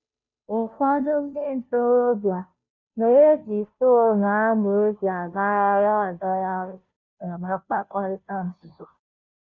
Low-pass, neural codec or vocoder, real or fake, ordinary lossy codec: 7.2 kHz; codec, 16 kHz, 0.5 kbps, FunCodec, trained on Chinese and English, 25 frames a second; fake; none